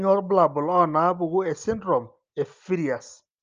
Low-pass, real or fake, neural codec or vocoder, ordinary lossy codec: 7.2 kHz; real; none; Opus, 24 kbps